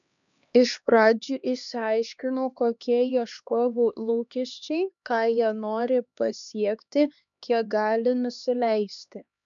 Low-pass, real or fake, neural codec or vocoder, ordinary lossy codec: 7.2 kHz; fake; codec, 16 kHz, 2 kbps, X-Codec, HuBERT features, trained on LibriSpeech; MP3, 96 kbps